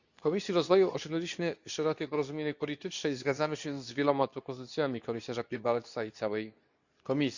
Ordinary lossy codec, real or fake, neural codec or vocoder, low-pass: none; fake; codec, 24 kHz, 0.9 kbps, WavTokenizer, medium speech release version 2; 7.2 kHz